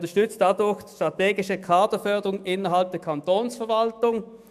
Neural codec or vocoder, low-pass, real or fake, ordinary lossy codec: autoencoder, 48 kHz, 128 numbers a frame, DAC-VAE, trained on Japanese speech; 14.4 kHz; fake; none